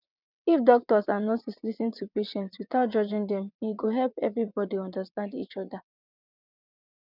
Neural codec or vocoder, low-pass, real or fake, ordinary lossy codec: vocoder, 22.05 kHz, 80 mel bands, WaveNeXt; 5.4 kHz; fake; none